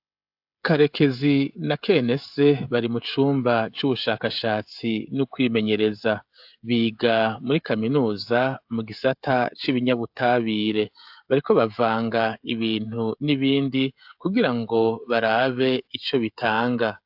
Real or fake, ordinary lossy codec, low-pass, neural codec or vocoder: fake; AAC, 48 kbps; 5.4 kHz; codec, 16 kHz, 16 kbps, FreqCodec, smaller model